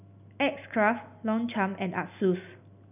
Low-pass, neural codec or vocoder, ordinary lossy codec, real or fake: 3.6 kHz; none; none; real